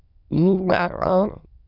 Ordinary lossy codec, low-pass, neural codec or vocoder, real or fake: Opus, 64 kbps; 5.4 kHz; autoencoder, 22.05 kHz, a latent of 192 numbers a frame, VITS, trained on many speakers; fake